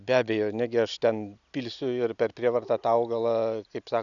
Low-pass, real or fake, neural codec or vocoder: 7.2 kHz; real; none